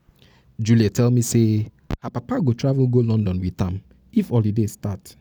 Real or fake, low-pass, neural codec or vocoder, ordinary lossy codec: real; none; none; none